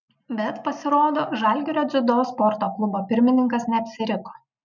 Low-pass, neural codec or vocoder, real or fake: 7.2 kHz; none; real